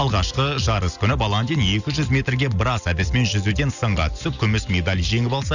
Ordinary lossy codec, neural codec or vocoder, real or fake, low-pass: none; none; real; 7.2 kHz